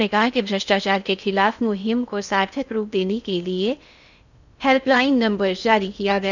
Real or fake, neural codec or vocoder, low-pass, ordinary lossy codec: fake; codec, 16 kHz in and 24 kHz out, 0.6 kbps, FocalCodec, streaming, 4096 codes; 7.2 kHz; none